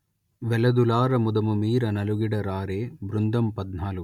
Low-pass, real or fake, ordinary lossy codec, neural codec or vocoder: 19.8 kHz; real; none; none